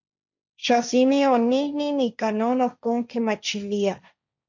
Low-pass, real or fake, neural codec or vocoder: 7.2 kHz; fake; codec, 16 kHz, 1.1 kbps, Voila-Tokenizer